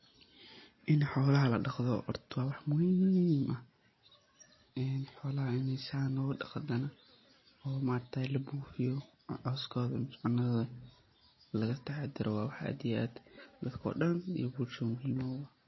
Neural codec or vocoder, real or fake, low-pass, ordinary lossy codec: none; real; 7.2 kHz; MP3, 24 kbps